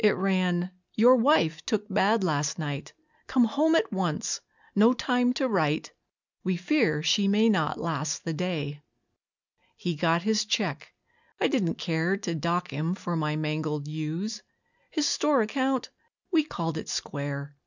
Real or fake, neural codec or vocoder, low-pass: real; none; 7.2 kHz